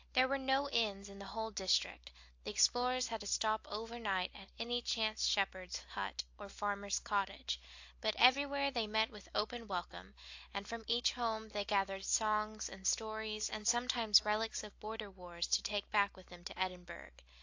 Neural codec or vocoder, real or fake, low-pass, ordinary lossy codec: none; real; 7.2 kHz; AAC, 48 kbps